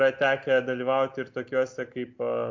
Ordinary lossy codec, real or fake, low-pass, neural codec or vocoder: MP3, 48 kbps; real; 7.2 kHz; none